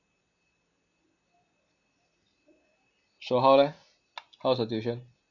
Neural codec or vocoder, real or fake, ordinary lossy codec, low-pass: none; real; none; 7.2 kHz